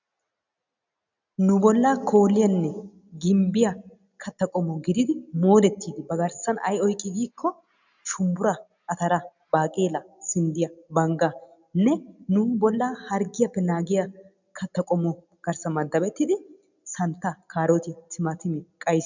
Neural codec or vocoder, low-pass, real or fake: none; 7.2 kHz; real